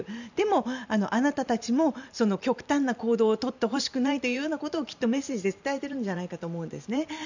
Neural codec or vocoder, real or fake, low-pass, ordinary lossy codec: vocoder, 44.1 kHz, 128 mel bands every 512 samples, BigVGAN v2; fake; 7.2 kHz; none